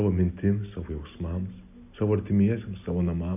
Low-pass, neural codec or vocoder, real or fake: 3.6 kHz; none; real